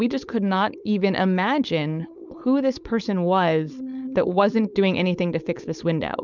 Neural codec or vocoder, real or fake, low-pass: codec, 16 kHz, 4.8 kbps, FACodec; fake; 7.2 kHz